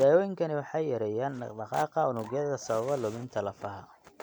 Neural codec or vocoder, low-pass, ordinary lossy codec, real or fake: none; none; none; real